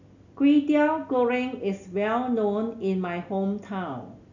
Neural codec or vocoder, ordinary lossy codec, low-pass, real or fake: none; none; 7.2 kHz; real